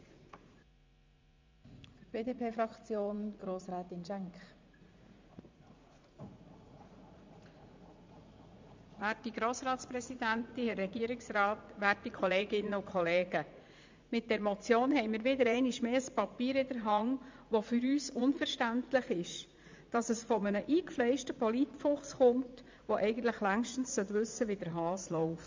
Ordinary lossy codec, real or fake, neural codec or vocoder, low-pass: MP3, 64 kbps; fake; vocoder, 44.1 kHz, 80 mel bands, Vocos; 7.2 kHz